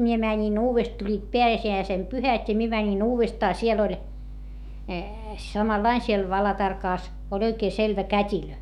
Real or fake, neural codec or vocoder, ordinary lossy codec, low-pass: fake; autoencoder, 48 kHz, 128 numbers a frame, DAC-VAE, trained on Japanese speech; none; 19.8 kHz